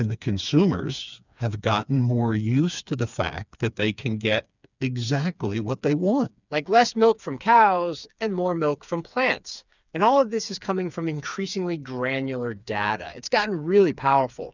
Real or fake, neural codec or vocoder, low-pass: fake; codec, 16 kHz, 4 kbps, FreqCodec, smaller model; 7.2 kHz